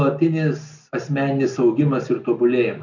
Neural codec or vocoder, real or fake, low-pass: none; real; 7.2 kHz